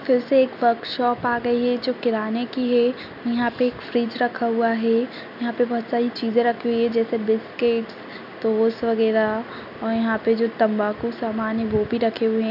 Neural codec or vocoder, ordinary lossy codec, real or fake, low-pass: none; none; real; 5.4 kHz